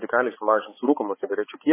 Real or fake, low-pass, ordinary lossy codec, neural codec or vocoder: fake; 3.6 kHz; MP3, 16 kbps; codec, 16 kHz, 4 kbps, X-Codec, HuBERT features, trained on balanced general audio